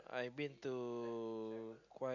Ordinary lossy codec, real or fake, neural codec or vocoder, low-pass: none; real; none; 7.2 kHz